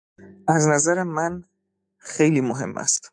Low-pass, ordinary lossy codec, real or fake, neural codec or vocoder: 9.9 kHz; MP3, 96 kbps; fake; autoencoder, 48 kHz, 128 numbers a frame, DAC-VAE, trained on Japanese speech